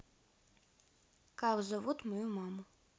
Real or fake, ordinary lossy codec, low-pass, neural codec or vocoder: real; none; none; none